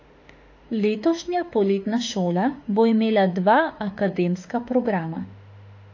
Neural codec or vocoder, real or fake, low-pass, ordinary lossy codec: autoencoder, 48 kHz, 32 numbers a frame, DAC-VAE, trained on Japanese speech; fake; 7.2 kHz; AAC, 48 kbps